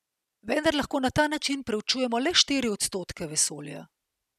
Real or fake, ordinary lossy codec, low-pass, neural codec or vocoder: real; none; 14.4 kHz; none